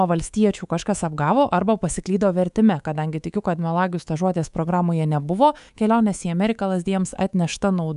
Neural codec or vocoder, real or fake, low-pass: codec, 24 kHz, 3.1 kbps, DualCodec; fake; 10.8 kHz